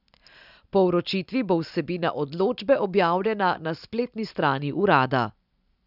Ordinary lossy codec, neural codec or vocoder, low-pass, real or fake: none; none; 5.4 kHz; real